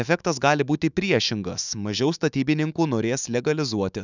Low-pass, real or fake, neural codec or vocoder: 7.2 kHz; fake; autoencoder, 48 kHz, 128 numbers a frame, DAC-VAE, trained on Japanese speech